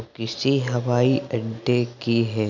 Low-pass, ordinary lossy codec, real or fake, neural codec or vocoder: 7.2 kHz; none; real; none